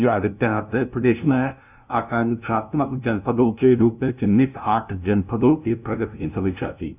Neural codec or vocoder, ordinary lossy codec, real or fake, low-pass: codec, 16 kHz, 0.5 kbps, FunCodec, trained on LibriTTS, 25 frames a second; none; fake; 3.6 kHz